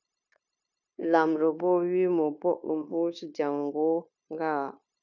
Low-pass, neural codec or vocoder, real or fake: 7.2 kHz; codec, 16 kHz, 0.9 kbps, LongCat-Audio-Codec; fake